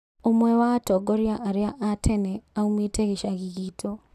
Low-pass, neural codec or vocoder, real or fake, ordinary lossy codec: 14.4 kHz; none; real; none